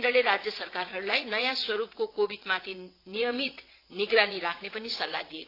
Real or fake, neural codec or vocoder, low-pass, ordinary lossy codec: fake; vocoder, 22.05 kHz, 80 mel bands, WaveNeXt; 5.4 kHz; AAC, 32 kbps